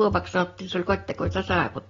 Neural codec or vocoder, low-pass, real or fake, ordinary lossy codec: none; 7.2 kHz; real; AAC, 24 kbps